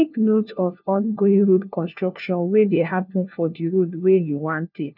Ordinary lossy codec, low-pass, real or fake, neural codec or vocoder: none; 5.4 kHz; fake; codec, 16 kHz, 1 kbps, FunCodec, trained on LibriTTS, 50 frames a second